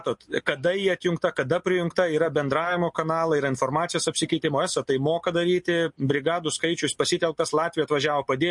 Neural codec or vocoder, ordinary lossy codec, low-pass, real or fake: none; MP3, 48 kbps; 10.8 kHz; real